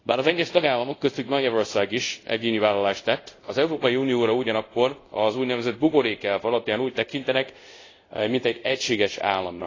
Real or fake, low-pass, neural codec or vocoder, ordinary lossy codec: fake; 7.2 kHz; codec, 24 kHz, 0.5 kbps, DualCodec; AAC, 32 kbps